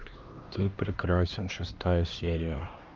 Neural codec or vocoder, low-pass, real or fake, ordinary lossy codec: codec, 16 kHz, 2 kbps, X-Codec, HuBERT features, trained on LibriSpeech; 7.2 kHz; fake; Opus, 32 kbps